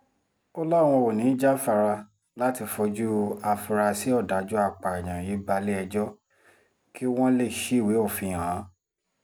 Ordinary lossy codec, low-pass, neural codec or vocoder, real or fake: none; none; none; real